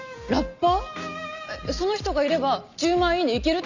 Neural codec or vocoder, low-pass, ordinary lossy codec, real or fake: none; 7.2 kHz; none; real